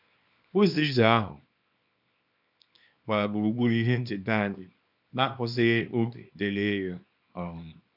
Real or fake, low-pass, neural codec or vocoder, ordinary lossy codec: fake; 5.4 kHz; codec, 24 kHz, 0.9 kbps, WavTokenizer, small release; none